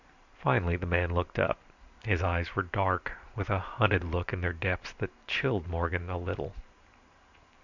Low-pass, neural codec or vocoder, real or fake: 7.2 kHz; vocoder, 44.1 kHz, 128 mel bands every 512 samples, BigVGAN v2; fake